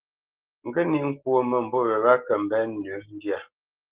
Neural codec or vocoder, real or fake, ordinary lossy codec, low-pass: none; real; Opus, 16 kbps; 3.6 kHz